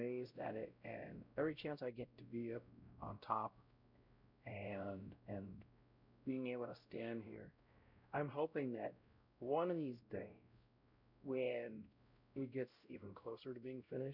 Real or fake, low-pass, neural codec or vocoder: fake; 5.4 kHz; codec, 16 kHz, 0.5 kbps, X-Codec, WavLM features, trained on Multilingual LibriSpeech